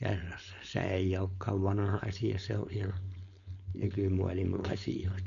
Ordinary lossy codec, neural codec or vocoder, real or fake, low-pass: none; codec, 16 kHz, 4.8 kbps, FACodec; fake; 7.2 kHz